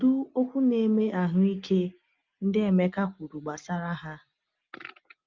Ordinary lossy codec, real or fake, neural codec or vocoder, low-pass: Opus, 24 kbps; real; none; 7.2 kHz